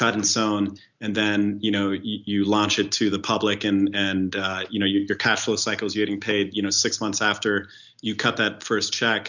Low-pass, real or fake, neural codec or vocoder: 7.2 kHz; real; none